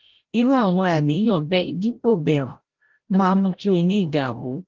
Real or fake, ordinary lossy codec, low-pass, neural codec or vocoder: fake; Opus, 16 kbps; 7.2 kHz; codec, 16 kHz, 0.5 kbps, FreqCodec, larger model